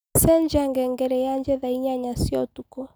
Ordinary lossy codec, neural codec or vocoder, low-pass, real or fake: none; none; none; real